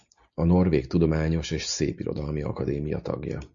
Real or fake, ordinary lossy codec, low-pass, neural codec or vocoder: real; MP3, 64 kbps; 7.2 kHz; none